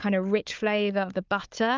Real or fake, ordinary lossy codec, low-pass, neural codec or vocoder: fake; Opus, 24 kbps; 7.2 kHz; codec, 16 kHz, 4 kbps, FunCodec, trained on Chinese and English, 50 frames a second